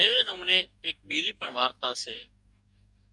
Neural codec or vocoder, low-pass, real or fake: codec, 44.1 kHz, 2.6 kbps, DAC; 10.8 kHz; fake